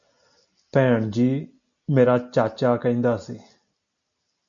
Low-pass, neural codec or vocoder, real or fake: 7.2 kHz; none; real